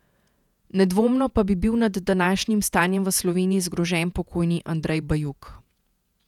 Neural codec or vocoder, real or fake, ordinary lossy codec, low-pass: vocoder, 48 kHz, 128 mel bands, Vocos; fake; none; 19.8 kHz